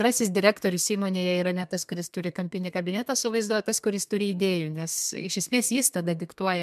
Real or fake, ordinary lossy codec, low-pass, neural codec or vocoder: fake; MP3, 96 kbps; 14.4 kHz; codec, 32 kHz, 1.9 kbps, SNAC